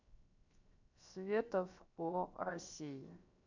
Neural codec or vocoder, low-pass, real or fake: codec, 16 kHz, 0.7 kbps, FocalCodec; 7.2 kHz; fake